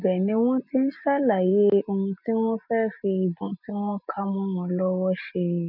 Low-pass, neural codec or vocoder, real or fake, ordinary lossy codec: 5.4 kHz; none; real; none